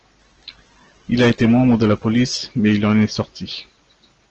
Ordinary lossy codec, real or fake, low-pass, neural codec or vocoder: Opus, 16 kbps; real; 7.2 kHz; none